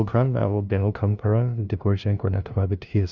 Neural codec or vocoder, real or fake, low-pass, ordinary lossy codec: codec, 16 kHz, 0.5 kbps, FunCodec, trained on LibriTTS, 25 frames a second; fake; 7.2 kHz; Opus, 64 kbps